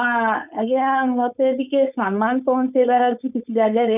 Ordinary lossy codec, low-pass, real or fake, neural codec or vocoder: none; 3.6 kHz; fake; codec, 16 kHz, 4.8 kbps, FACodec